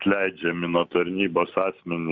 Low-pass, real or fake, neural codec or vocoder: 7.2 kHz; real; none